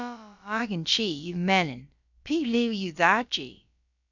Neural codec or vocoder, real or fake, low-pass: codec, 16 kHz, about 1 kbps, DyCAST, with the encoder's durations; fake; 7.2 kHz